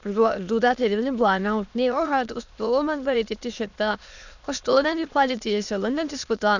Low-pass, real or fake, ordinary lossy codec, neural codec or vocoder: 7.2 kHz; fake; none; autoencoder, 22.05 kHz, a latent of 192 numbers a frame, VITS, trained on many speakers